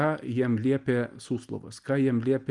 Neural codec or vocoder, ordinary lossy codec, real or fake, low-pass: none; Opus, 32 kbps; real; 10.8 kHz